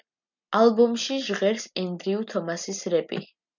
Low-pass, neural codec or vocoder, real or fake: 7.2 kHz; none; real